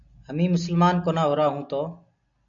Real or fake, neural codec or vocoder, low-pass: real; none; 7.2 kHz